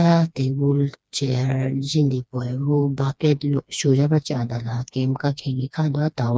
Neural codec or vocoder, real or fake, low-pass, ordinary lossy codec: codec, 16 kHz, 2 kbps, FreqCodec, smaller model; fake; none; none